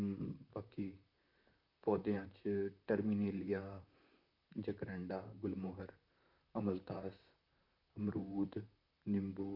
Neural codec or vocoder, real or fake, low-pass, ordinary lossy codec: vocoder, 44.1 kHz, 128 mel bands, Pupu-Vocoder; fake; 5.4 kHz; none